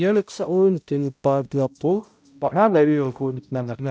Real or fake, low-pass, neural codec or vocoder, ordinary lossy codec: fake; none; codec, 16 kHz, 0.5 kbps, X-Codec, HuBERT features, trained on balanced general audio; none